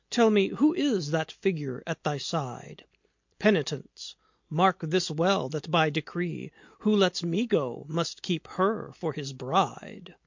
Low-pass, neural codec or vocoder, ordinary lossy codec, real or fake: 7.2 kHz; none; MP3, 48 kbps; real